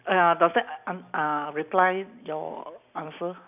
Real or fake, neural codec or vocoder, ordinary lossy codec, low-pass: real; none; none; 3.6 kHz